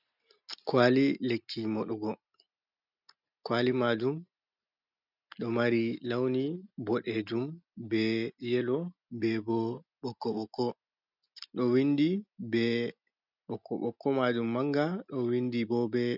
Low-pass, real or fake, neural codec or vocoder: 5.4 kHz; real; none